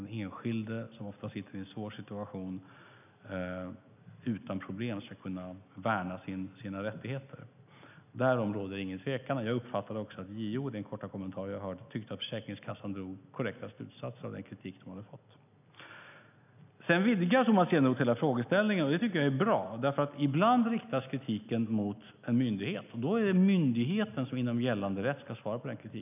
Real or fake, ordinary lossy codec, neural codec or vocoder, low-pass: real; none; none; 3.6 kHz